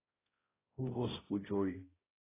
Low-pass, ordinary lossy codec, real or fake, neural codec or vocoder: 3.6 kHz; AAC, 16 kbps; fake; codec, 16 kHz, 0.5 kbps, X-Codec, HuBERT features, trained on balanced general audio